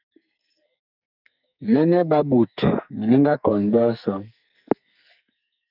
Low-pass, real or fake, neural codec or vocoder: 5.4 kHz; fake; codec, 44.1 kHz, 2.6 kbps, SNAC